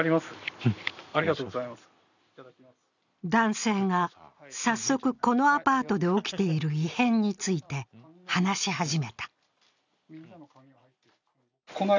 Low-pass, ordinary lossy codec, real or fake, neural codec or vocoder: 7.2 kHz; none; real; none